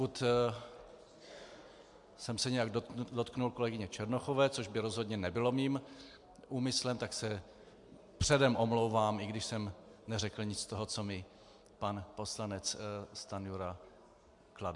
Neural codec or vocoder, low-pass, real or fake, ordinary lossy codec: none; 10.8 kHz; real; MP3, 64 kbps